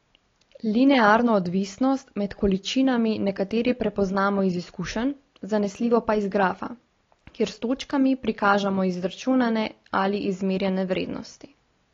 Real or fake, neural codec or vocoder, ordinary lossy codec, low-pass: real; none; AAC, 32 kbps; 7.2 kHz